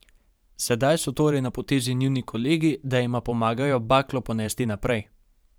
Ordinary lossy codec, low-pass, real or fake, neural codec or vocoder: none; none; fake; vocoder, 44.1 kHz, 128 mel bands every 512 samples, BigVGAN v2